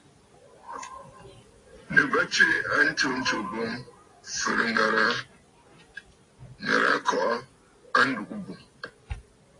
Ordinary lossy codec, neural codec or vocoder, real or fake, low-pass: AAC, 32 kbps; none; real; 10.8 kHz